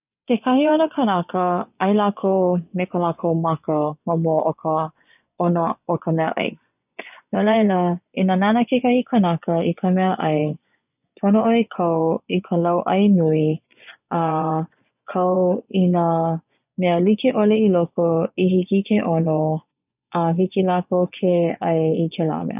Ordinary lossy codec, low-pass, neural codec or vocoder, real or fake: none; 3.6 kHz; vocoder, 24 kHz, 100 mel bands, Vocos; fake